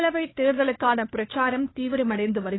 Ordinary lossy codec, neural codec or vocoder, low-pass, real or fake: AAC, 16 kbps; codec, 16 kHz, 2 kbps, FunCodec, trained on Chinese and English, 25 frames a second; 7.2 kHz; fake